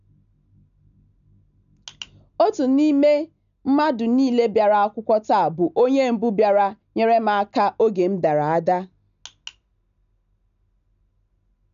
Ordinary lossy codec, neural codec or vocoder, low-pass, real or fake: none; none; 7.2 kHz; real